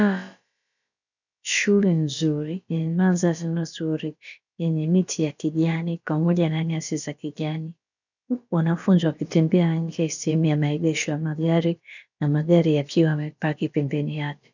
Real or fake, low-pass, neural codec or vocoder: fake; 7.2 kHz; codec, 16 kHz, about 1 kbps, DyCAST, with the encoder's durations